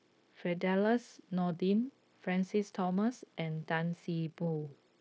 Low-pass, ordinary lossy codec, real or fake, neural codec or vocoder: none; none; fake; codec, 16 kHz, 0.9 kbps, LongCat-Audio-Codec